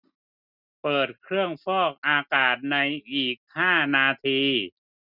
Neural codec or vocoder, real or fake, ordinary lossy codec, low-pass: none; real; none; 5.4 kHz